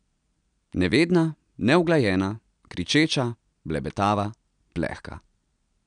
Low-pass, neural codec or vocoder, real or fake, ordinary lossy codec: 9.9 kHz; none; real; none